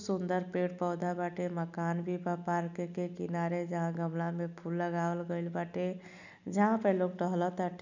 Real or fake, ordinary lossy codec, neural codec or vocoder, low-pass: real; none; none; 7.2 kHz